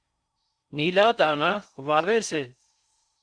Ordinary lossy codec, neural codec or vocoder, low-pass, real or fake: Opus, 64 kbps; codec, 16 kHz in and 24 kHz out, 0.6 kbps, FocalCodec, streaming, 4096 codes; 9.9 kHz; fake